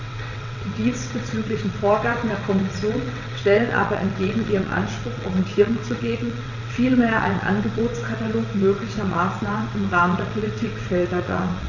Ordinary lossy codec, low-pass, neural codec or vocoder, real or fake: none; 7.2 kHz; vocoder, 22.05 kHz, 80 mel bands, WaveNeXt; fake